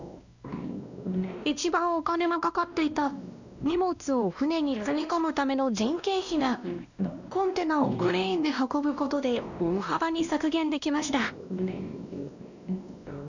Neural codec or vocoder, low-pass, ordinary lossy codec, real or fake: codec, 16 kHz, 1 kbps, X-Codec, WavLM features, trained on Multilingual LibriSpeech; 7.2 kHz; none; fake